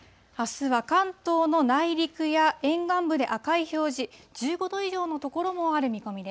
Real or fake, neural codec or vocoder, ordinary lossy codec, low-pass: real; none; none; none